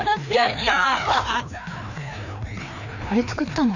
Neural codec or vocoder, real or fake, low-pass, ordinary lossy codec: codec, 16 kHz, 2 kbps, FreqCodec, larger model; fake; 7.2 kHz; none